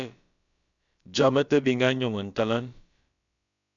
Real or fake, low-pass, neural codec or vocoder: fake; 7.2 kHz; codec, 16 kHz, about 1 kbps, DyCAST, with the encoder's durations